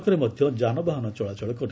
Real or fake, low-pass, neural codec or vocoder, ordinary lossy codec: real; none; none; none